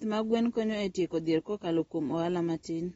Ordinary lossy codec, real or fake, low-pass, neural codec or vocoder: AAC, 24 kbps; real; 19.8 kHz; none